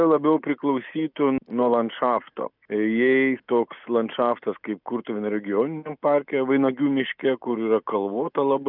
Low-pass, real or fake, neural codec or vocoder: 5.4 kHz; real; none